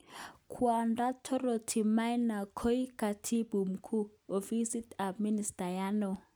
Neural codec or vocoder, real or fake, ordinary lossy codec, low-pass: none; real; none; none